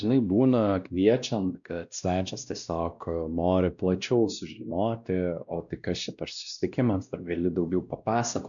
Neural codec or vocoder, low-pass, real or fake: codec, 16 kHz, 1 kbps, X-Codec, WavLM features, trained on Multilingual LibriSpeech; 7.2 kHz; fake